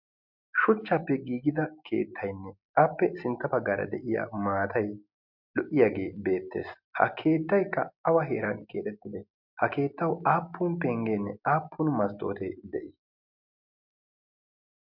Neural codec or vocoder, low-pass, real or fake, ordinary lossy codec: none; 5.4 kHz; real; MP3, 48 kbps